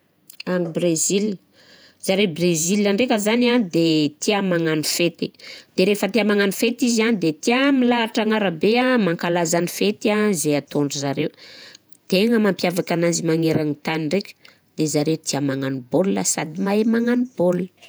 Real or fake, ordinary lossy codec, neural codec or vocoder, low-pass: fake; none; vocoder, 48 kHz, 128 mel bands, Vocos; none